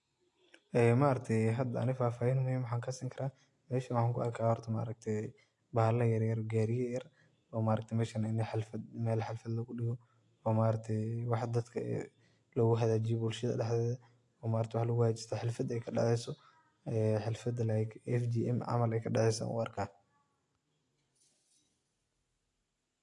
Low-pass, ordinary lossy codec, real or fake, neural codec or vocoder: 10.8 kHz; AAC, 48 kbps; real; none